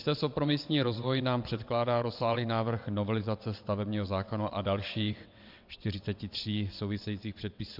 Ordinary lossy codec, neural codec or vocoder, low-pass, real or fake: MP3, 48 kbps; vocoder, 22.05 kHz, 80 mel bands, WaveNeXt; 5.4 kHz; fake